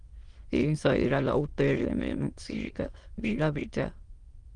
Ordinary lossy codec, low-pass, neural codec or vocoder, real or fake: Opus, 24 kbps; 9.9 kHz; autoencoder, 22.05 kHz, a latent of 192 numbers a frame, VITS, trained on many speakers; fake